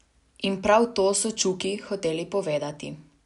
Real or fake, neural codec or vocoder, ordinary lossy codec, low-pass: real; none; none; 10.8 kHz